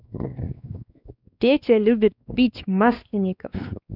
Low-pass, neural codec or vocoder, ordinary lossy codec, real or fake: 5.4 kHz; codec, 16 kHz, 2 kbps, X-Codec, WavLM features, trained on Multilingual LibriSpeech; none; fake